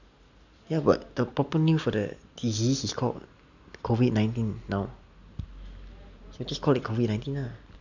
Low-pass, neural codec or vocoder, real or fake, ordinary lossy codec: 7.2 kHz; none; real; none